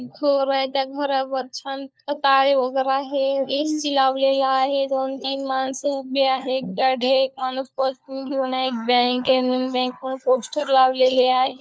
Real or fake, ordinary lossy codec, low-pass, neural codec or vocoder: fake; none; none; codec, 16 kHz, 4 kbps, FunCodec, trained on LibriTTS, 50 frames a second